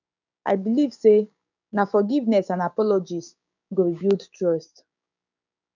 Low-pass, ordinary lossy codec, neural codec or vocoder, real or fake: 7.2 kHz; none; codec, 16 kHz, 6 kbps, DAC; fake